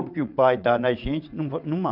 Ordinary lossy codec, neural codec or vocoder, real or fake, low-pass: none; vocoder, 22.05 kHz, 80 mel bands, WaveNeXt; fake; 5.4 kHz